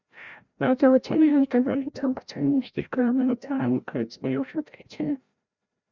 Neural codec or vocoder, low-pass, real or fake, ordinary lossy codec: codec, 16 kHz, 0.5 kbps, FreqCodec, larger model; 7.2 kHz; fake; Opus, 64 kbps